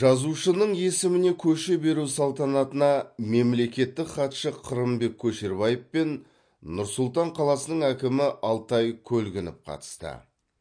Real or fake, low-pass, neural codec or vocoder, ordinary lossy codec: real; 9.9 kHz; none; MP3, 48 kbps